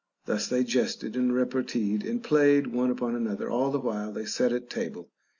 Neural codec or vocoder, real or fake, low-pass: none; real; 7.2 kHz